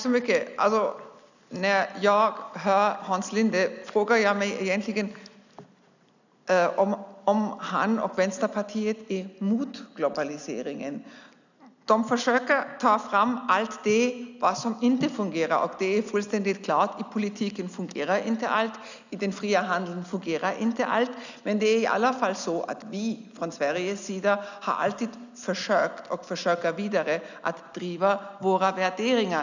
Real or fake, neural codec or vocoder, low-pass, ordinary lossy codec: real; none; 7.2 kHz; none